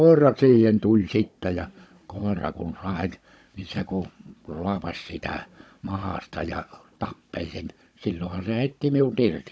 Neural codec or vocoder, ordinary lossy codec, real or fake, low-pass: codec, 16 kHz, 16 kbps, FunCodec, trained on Chinese and English, 50 frames a second; none; fake; none